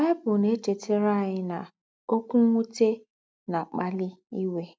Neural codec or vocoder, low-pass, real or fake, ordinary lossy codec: none; none; real; none